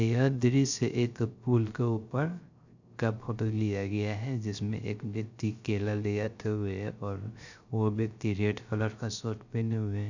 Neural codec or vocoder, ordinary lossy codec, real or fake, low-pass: codec, 16 kHz, 0.3 kbps, FocalCodec; none; fake; 7.2 kHz